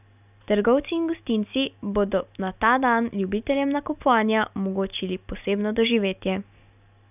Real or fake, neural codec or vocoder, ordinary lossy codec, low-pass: real; none; none; 3.6 kHz